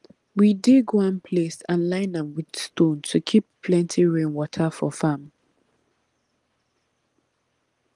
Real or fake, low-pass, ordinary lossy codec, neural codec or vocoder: real; 10.8 kHz; Opus, 24 kbps; none